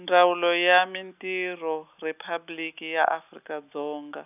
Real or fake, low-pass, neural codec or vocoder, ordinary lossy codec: real; 3.6 kHz; none; none